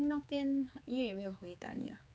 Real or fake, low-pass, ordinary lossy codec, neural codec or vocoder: fake; none; none; codec, 16 kHz, 4 kbps, X-Codec, HuBERT features, trained on general audio